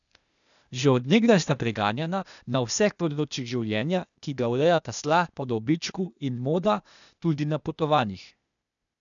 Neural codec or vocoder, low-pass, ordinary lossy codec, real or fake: codec, 16 kHz, 0.8 kbps, ZipCodec; 7.2 kHz; none; fake